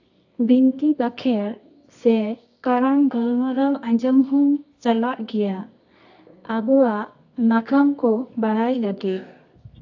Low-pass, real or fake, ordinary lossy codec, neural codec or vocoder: 7.2 kHz; fake; AAC, 48 kbps; codec, 24 kHz, 0.9 kbps, WavTokenizer, medium music audio release